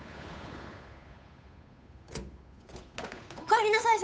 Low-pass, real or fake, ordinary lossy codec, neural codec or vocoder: none; fake; none; codec, 16 kHz, 8 kbps, FunCodec, trained on Chinese and English, 25 frames a second